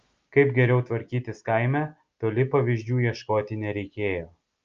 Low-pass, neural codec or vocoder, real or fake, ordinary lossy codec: 7.2 kHz; none; real; Opus, 24 kbps